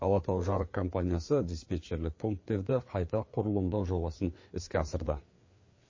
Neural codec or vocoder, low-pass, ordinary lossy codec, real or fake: codec, 16 kHz, 4 kbps, FreqCodec, larger model; 7.2 kHz; MP3, 32 kbps; fake